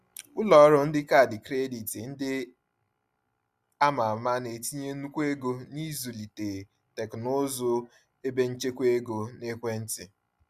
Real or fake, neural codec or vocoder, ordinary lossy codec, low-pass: real; none; Opus, 64 kbps; 14.4 kHz